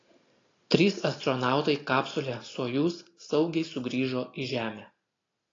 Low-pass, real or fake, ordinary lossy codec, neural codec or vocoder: 7.2 kHz; real; AAC, 32 kbps; none